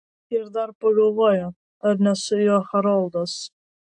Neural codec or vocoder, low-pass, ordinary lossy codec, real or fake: none; 9.9 kHz; AAC, 64 kbps; real